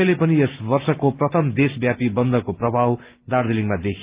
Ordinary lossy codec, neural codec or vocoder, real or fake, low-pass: Opus, 32 kbps; none; real; 3.6 kHz